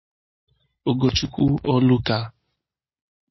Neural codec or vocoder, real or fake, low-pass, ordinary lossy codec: none; real; 7.2 kHz; MP3, 24 kbps